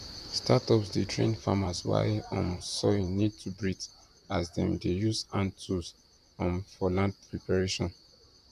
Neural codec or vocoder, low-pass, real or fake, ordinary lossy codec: vocoder, 44.1 kHz, 128 mel bands, Pupu-Vocoder; 14.4 kHz; fake; none